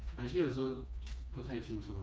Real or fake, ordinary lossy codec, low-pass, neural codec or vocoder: fake; none; none; codec, 16 kHz, 2 kbps, FreqCodec, smaller model